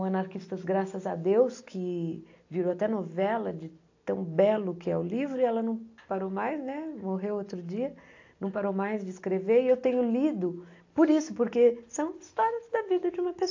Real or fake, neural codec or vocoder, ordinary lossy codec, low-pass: real; none; AAC, 48 kbps; 7.2 kHz